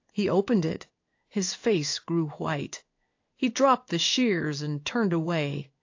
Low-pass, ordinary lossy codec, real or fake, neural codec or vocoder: 7.2 kHz; AAC, 48 kbps; fake; codec, 16 kHz in and 24 kHz out, 1 kbps, XY-Tokenizer